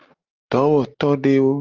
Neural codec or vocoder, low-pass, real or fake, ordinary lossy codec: none; 7.2 kHz; real; Opus, 24 kbps